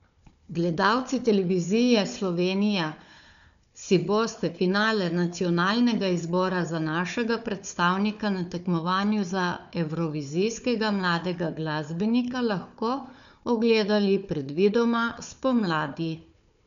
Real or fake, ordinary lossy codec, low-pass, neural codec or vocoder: fake; none; 7.2 kHz; codec, 16 kHz, 4 kbps, FunCodec, trained on Chinese and English, 50 frames a second